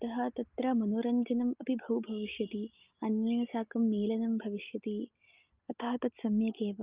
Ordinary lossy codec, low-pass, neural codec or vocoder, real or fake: Opus, 32 kbps; 3.6 kHz; none; real